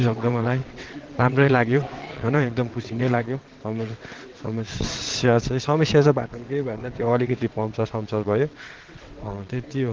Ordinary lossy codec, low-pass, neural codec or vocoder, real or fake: Opus, 16 kbps; 7.2 kHz; vocoder, 22.05 kHz, 80 mel bands, WaveNeXt; fake